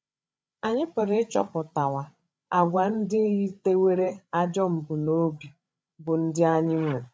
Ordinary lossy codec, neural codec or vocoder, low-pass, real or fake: none; codec, 16 kHz, 16 kbps, FreqCodec, larger model; none; fake